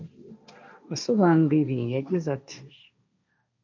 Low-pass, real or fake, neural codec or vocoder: 7.2 kHz; fake; codec, 16 kHz, 1.1 kbps, Voila-Tokenizer